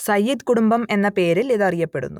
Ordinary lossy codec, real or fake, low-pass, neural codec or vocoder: none; real; 19.8 kHz; none